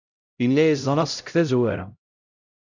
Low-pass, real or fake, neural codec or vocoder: 7.2 kHz; fake; codec, 16 kHz, 0.5 kbps, X-Codec, HuBERT features, trained on LibriSpeech